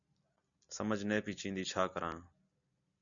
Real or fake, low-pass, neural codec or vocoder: real; 7.2 kHz; none